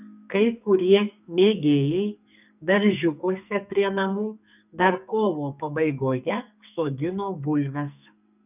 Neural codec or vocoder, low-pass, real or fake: codec, 44.1 kHz, 2.6 kbps, SNAC; 3.6 kHz; fake